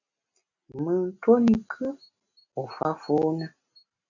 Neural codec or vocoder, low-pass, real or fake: none; 7.2 kHz; real